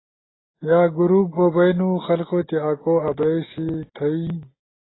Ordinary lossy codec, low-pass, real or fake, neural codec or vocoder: AAC, 16 kbps; 7.2 kHz; real; none